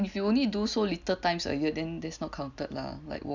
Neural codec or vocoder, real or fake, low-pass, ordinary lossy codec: none; real; 7.2 kHz; none